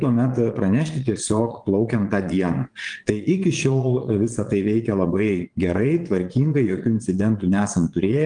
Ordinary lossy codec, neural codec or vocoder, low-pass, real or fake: Opus, 32 kbps; vocoder, 22.05 kHz, 80 mel bands, Vocos; 9.9 kHz; fake